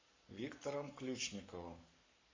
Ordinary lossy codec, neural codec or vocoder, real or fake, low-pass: MP3, 64 kbps; codec, 44.1 kHz, 7.8 kbps, Pupu-Codec; fake; 7.2 kHz